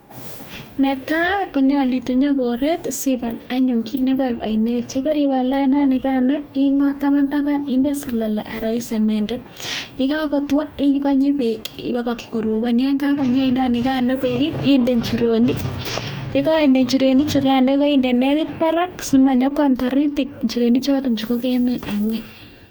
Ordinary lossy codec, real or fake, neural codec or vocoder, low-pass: none; fake; codec, 44.1 kHz, 2.6 kbps, DAC; none